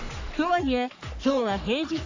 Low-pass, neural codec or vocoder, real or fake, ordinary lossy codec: 7.2 kHz; codec, 44.1 kHz, 3.4 kbps, Pupu-Codec; fake; AAC, 48 kbps